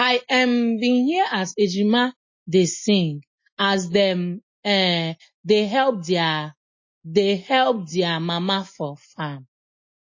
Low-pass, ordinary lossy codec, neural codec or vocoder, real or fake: 7.2 kHz; MP3, 32 kbps; none; real